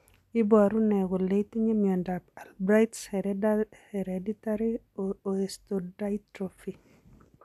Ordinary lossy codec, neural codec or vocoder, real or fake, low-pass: none; none; real; 14.4 kHz